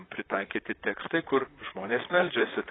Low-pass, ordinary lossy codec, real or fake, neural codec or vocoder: 7.2 kHz; AAC, 16 kbps; fake; vocoder, 44.1 kHz, 128 mel bands, Pupu-Vocoder